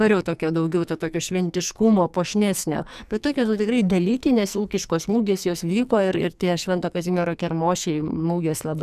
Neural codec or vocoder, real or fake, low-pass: codec, 44.1 kHz, 2.6 kbps, SNAC; fake; 14.4 kHz